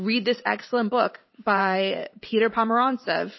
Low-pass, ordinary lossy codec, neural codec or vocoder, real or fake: 7.2 kHz; MP3, 24 kbps; vocoder, 44.1 kHz, 128 mel bands every 512 samples, BigVGAN v2; fake